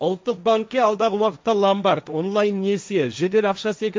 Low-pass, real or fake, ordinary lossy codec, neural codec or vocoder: none; fake; none; codec, 16 kHz, 1.1 kbps, Voila-Tokenizer